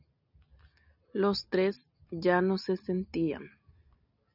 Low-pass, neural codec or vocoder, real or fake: 5.4 kHz; none; real